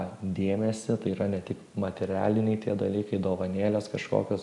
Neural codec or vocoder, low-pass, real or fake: none; 10.8 kHz; real